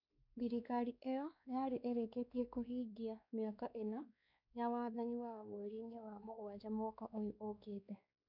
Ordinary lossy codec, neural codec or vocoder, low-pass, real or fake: none; codec, 16 kHz, 2 kbps, X-Codec, WavLM features, trained on Multilingual LibriSpeech; 5.4 kHz; fake